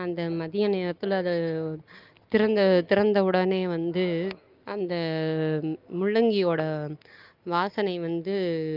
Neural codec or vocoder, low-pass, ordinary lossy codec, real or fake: none; 5.4 kHz; Opus, 24 kbps; real